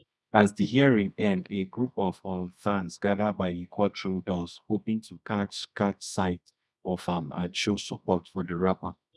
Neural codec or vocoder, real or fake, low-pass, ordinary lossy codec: codec, 24 kHz, 0.9 kbps, WavTokenizer, medium music audio release; fake; none; none